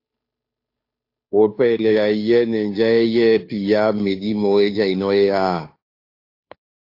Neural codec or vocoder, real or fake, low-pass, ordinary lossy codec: codec, 16 kHz, 2 kbps, FunCodec, trained on Chinese and English, 25 frames a second; fake; 5.4 kHz; AAC, 32 kbps